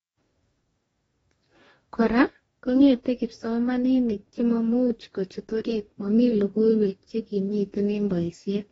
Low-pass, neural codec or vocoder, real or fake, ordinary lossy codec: 19.8 kHz; codec, 44.1 kHz, 2.6 kbps, DAC; fake; AAC, 24 kbps